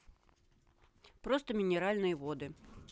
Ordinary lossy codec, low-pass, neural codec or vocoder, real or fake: none; none; none; real